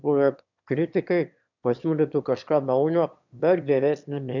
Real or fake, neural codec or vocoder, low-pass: fake; autoencoder, 22.05 kHz, a latent of 192 numbers a frame, VITS, trained on one speaker; 7.2 kHz